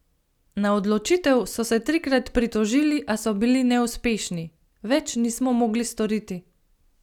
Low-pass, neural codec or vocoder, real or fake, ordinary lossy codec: 19.8 kHz; none; real; none